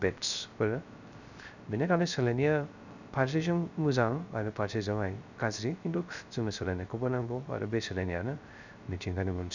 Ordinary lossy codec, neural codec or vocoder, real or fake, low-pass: none; codec, 16 kHz, 0.3 kbps, FocalCodec; fake; 7.2 kHz